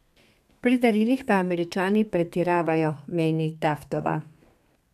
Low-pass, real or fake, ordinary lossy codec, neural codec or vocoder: 14.4 kHz; fake; none; codec, 32 kHz, 1.9 kbps, SNAC